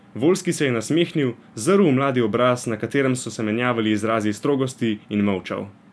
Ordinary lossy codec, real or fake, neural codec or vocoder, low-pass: none; real; none; none